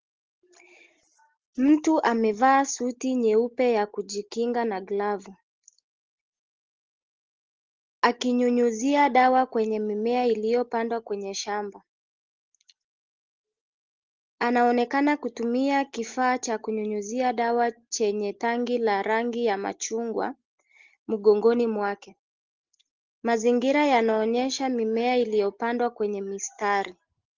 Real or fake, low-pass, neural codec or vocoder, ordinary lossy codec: real; 7.2 kHz; none; Opus, 16 kbps